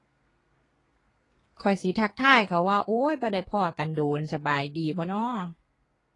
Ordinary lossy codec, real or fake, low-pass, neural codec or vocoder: AAC, 32 kbps; fake; 10.8 kHz; codec, 32 kHz, 1.9 kbps, SNAC